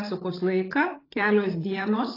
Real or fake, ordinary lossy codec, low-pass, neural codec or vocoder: fake; AAC, 24 kbps; 5.4 kHz; codec, 16 kHz, 8 kbps, FreqCodec, larger model